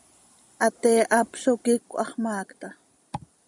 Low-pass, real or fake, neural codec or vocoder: 10.8 kHz; real; none